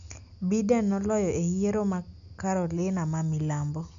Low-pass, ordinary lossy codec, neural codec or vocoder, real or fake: 7.2 kHz; none; none; real